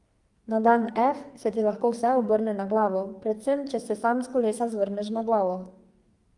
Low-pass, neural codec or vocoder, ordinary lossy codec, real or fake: 10.8 kHz; codec, 44.1 kHz, 2.6 kbps, SNAC; Opus, 32 kbps; fake